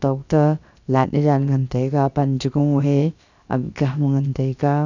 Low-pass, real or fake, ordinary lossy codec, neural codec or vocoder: 7.2 kHz; fake; none; codec, 16 kHz, about 1 kbps, DyCAST, with the encoder's durations